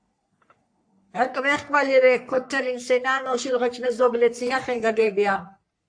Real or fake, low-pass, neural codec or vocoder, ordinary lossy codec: fake; 9.9 kHz; codec, 44.1 kHz, 3.4 kbps, Pupu-Codec; AAC, 64 kbps